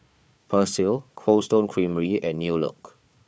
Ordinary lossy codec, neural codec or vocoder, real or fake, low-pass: none; codec, 16 kHz, 4 kbps, FunCodec, trained on Chinese and English, 50 frames a second; fake; none